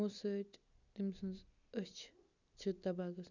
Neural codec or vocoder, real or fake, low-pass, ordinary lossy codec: none; real; 7.2 kHz; none